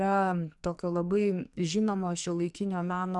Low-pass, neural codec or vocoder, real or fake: 10.8 kHz; codec, 44.1 kHz, 2.6 kbps, SNAC; fake